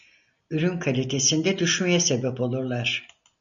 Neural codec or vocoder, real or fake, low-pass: none; real; 7.2 kHz